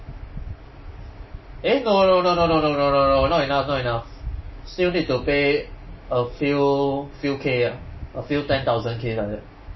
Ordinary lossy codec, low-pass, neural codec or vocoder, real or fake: MP3, 24 kbps; 7.2 kHz; none; real